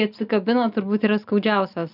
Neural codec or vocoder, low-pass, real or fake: none; 5.4 kHz; real